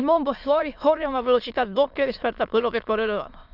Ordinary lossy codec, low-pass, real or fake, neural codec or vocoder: none; 5.4 kHz; fake; autoencoder, 22.05 kHz, a latent of 192 numbers a frame, VITS, trained on many speakers